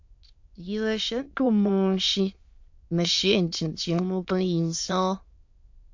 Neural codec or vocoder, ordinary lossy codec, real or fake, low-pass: autoencoder, 22.05 kHz, a latent of 192 numbers a frame, VITS, trained on many speakers; MP3, 48 kbps; fake; 7.2 kHz